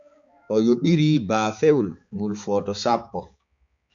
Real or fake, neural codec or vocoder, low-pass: fake; codec, 16 kHz, 4 kbps, X-Codec, HuBERT features, trained on balanced general audio; 7.2 kHz